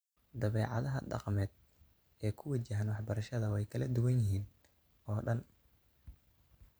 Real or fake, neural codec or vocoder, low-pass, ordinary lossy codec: fake; vocoder, 44.1 kHz, 128 mel bands every 512 samples, BigVGAN v2; none; none